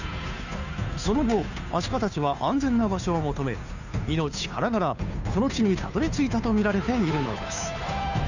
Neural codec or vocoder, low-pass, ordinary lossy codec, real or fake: codec, 16 kHz, 2 kbps, FunCodec, trained on Chinese and English, 25 frames a second; 7.2 kHz; none; fake